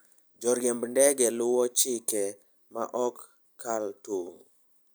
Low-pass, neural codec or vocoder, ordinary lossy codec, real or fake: none; none; none; real